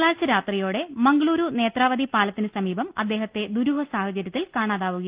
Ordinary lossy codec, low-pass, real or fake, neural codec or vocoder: Opus, 32 kbps; 3.6 kHz; real; none